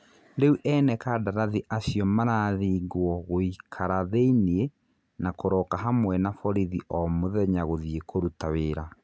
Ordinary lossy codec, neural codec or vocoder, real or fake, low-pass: none; none; real; none